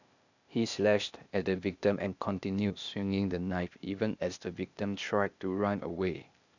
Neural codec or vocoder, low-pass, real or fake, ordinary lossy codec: codec, 16 kHz, 0.8 kbps, ZipCodec; 7.2 kHz; fake; none